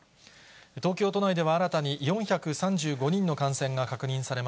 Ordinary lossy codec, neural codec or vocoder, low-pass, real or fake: none; none; none; real